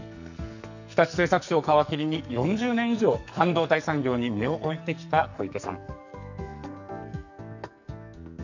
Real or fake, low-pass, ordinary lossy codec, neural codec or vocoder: fake; 7.2 kHz; none; codec, 44.1 kHz, 2.6 kbps, SNAC